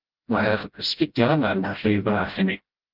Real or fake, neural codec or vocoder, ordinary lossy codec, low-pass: fake; codec, 16 kHz, 0.5 kbps, FreqCodec, smaller model; Opus, 24 kbps; 5.4 kHz